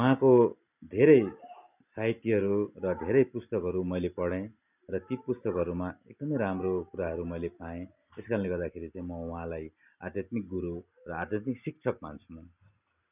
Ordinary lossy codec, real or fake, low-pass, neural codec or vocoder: none; real; 3.6 kHz; none